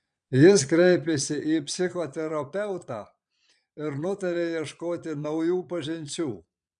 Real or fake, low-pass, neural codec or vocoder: fake; 9.9 kHz; vocoder, 22.05 kHz, 80 mel bands, Vocos